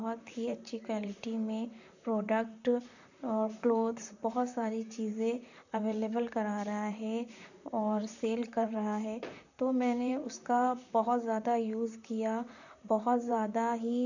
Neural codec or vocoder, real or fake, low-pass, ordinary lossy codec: vocoder, 44.1 kHz, 128 mel bands, Pupu-Vocoder; fake; 7.2 kHz; none